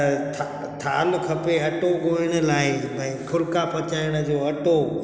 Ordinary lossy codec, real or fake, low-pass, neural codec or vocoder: none; real; none; none